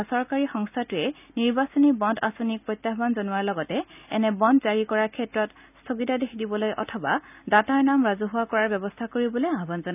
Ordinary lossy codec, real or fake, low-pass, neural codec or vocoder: none; real; 3.6 kHz; none